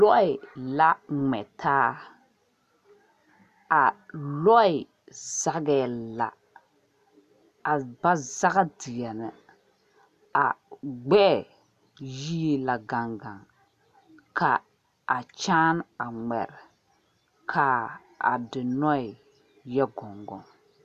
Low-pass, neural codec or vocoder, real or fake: 14.4 kHz; none; real